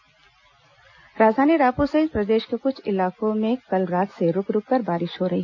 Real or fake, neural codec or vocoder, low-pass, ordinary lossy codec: real; none; 7.2 kHz; none